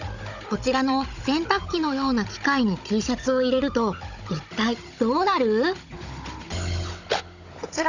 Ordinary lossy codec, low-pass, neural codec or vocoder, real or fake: none; 7.2 kHz; codec, 16 kHz, 16 kbps, FunCodec, trained on Chinese and English, 50 frames a second; fake